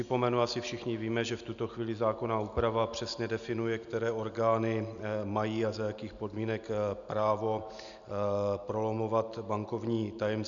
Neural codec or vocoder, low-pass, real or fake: none; 7.2 kHz; real